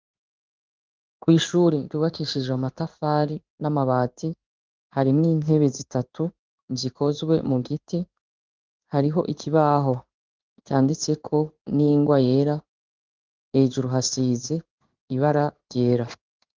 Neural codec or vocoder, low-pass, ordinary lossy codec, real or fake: codec, 16 kHz in and 24 kHz out, 1 kbps, XY-Tokenizer; 7.2 kHz; Opus, 32 kbps; fake